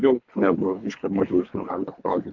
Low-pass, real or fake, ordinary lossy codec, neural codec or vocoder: 7.2 kHz; fake; AAC, 48 kbps; codec, 24 kHz, 3 kbps, HILCodec